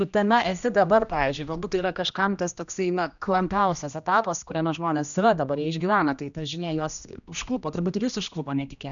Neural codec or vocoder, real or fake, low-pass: codec, 16 kHz, 1 kbps, X-Codec, HuBERT features, trained on general audio; fake; 7.2 kHz